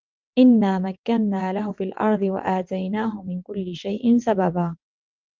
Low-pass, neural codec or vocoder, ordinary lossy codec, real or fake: 7.2 kHz; vocoder, 22.05 kHz, 80 mel bands, WaveNeXt; Opus, 32 kbps; fake